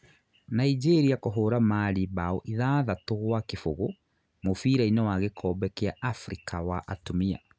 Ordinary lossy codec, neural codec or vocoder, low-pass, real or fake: none; none; none; real